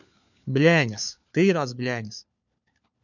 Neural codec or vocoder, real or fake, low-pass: codec, 16 kHz, 4 kbps, FunCodec, trained on LibriTTS, 50 frames a second; fake; 7.2 kHz